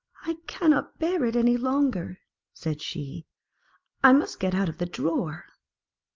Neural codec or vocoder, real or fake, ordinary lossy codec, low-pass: none; real; Opus, 32 kbps; 7.2 kHz